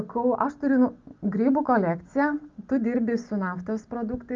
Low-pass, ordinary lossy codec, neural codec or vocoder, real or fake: 7.2 kHz; Opus, 32 kbps; none; real